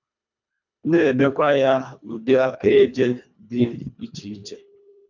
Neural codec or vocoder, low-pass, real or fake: codec, 24 kHz, 1.5 kbps, HILCodec; 7.2 kHz; fake